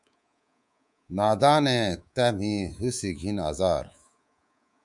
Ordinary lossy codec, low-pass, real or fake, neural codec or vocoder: MP3, 96 kbps; 10.8 kHz; fake; codec, 24 kHz, 3.1 kbps, DualCodec